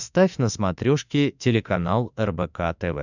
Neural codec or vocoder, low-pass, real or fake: autoencoder, 48 kHz, 32 numbers a frame, DAC-VAE, trained on Japanese speech; 7.2 kHz; fake